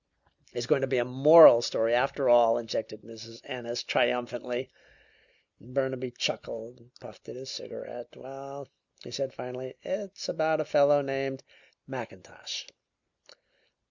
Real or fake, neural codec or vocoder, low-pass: real; none; 7.2 kHz